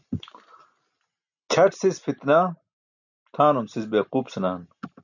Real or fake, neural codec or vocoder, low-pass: real; none; 7.2 kHz